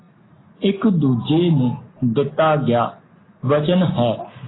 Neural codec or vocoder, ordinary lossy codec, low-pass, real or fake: none; AAC, 16 kbps; 7.2 kHz; real